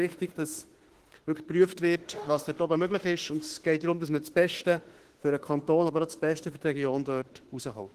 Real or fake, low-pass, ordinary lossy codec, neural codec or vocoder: fake; 14.4 kHz; Opus, 16 kbps; autoencoder, 48 kHz, 32 numbers a frame, DAC-VAE, trained on Japanese speech